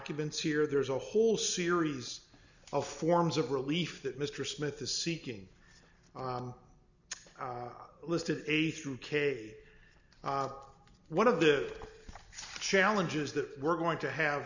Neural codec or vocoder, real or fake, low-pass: none; real; 7.2 kHz